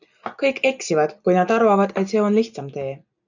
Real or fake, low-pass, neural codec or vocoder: real; 7.2 kHz; none